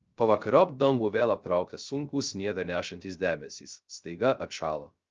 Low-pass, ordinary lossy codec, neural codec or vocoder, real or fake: 7.2 kHz; Opus, 24 kbps; codec, 16 kHz, 0.3 kbps, FocalCodec; fake